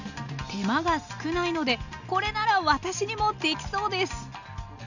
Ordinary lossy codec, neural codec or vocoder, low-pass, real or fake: none; none; 7.2 kHz; real